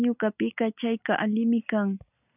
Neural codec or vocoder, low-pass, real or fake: none; 3.6 kHz; real